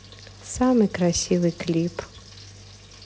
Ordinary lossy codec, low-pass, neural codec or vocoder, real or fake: none; none; none; real